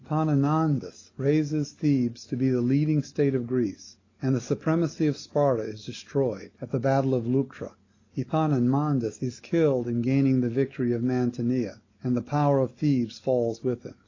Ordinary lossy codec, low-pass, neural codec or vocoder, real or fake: AAC, 32 kbps; 7.2 kHz; none; real